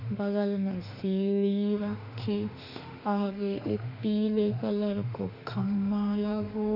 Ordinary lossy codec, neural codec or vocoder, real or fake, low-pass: none; autoencoder, 48 kHz, 32 numbers a frame, DAC-VAE, trained on Japanese speech; fake; 5.4 kHz